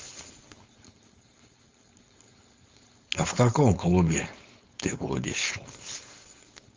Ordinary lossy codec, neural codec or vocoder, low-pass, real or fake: Opus, 32 kbps; codec, 16 kHz, 4.8 kbps, FACodec; 7.2 kHz; fake